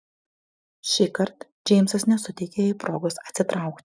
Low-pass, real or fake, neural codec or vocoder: 9.9 kHz; real; none